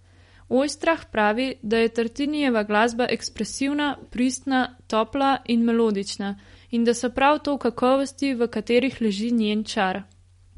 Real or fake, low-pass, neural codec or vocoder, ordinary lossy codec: real; 10.8 kHz; none; MP3, 48 kbps